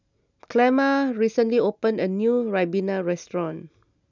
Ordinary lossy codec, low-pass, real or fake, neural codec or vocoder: none; 7.2 kHz; real; none